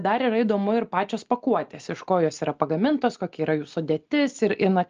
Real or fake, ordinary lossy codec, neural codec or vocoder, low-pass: real; Opus, 24 kbps; none; 7.2 kHz